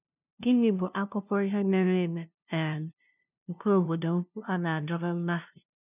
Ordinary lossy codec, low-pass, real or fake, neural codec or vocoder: none; 3.6 kHz; fake; codec, 16 kHz, 0.5 kbps, FunCodec, trained on LibriTTS, 25 frames a second